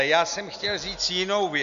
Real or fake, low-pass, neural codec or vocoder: real; 7.2 kHz; none